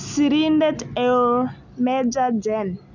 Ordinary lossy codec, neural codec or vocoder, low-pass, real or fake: none; none; 7.2 kHz; real